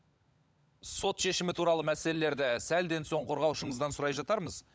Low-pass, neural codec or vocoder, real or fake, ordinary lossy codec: none; codec, 16 kHz, 16 kbps, FunCodec, trained on LibriTTS, 50 frames a second; fake; none